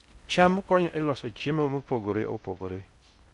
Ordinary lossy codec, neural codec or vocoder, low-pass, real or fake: none; codec, 16 kHz in and 24 kHz out, 0.6 kbps, FocalCodec, streaming, 4096 codes; 10.8 kHz; fake